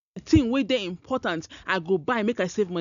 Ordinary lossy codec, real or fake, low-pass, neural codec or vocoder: none; real; 7.2 kHz; none